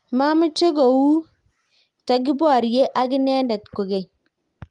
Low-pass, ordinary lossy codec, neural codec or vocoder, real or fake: 10.8 kHz; Opus, 24 kbps; none; real